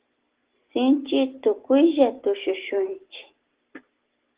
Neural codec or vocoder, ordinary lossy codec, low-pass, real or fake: none; Opus, 16 kbps; 3.6 kHz; real